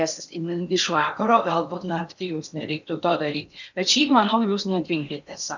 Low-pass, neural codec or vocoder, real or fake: 7.2 kHz; codec, 16 kHz in and 24 kHz out, 0.8 kbps, FocalCodec, streaming, 65536 codes; fake